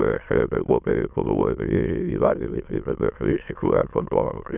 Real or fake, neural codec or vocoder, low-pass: fake; autoencoder, 22.05 kHz, a latent of 192 numbers a frame, VITS, trained on many speakers; 3.6 kHz